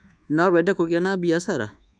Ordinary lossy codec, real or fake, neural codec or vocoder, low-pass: none; fake; codec, 24 kHz, 1.2 kbps, DualCodec; 9.9 kHz